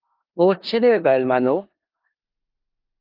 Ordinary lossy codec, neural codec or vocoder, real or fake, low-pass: Opus, 24 kbps; codec, 16 kHz in and 24 kHz out, 0.4 kbps, LongCat-Audio-Codec, four codebook decoder; fake; 5.4 kHz